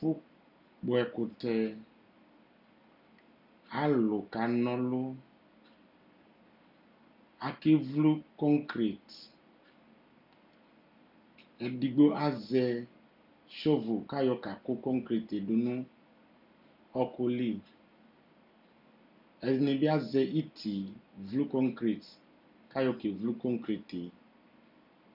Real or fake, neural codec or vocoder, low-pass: real; none; 5.4 kHz